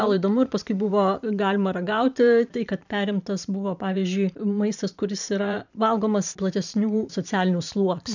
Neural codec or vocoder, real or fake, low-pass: vocoder, 44.1 kHz, 128 mel bands every 512 samples, BigVGAN v2; fake; 7.2 kHz